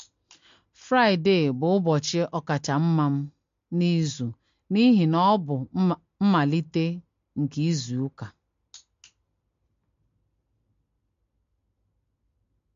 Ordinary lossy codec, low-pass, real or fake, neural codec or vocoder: MP3, 48 kbps; 7.2 kHz; real; none